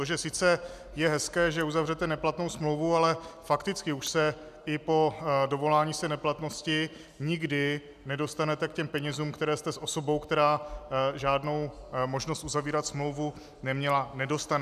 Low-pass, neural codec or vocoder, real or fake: 14.4 kHz; none; real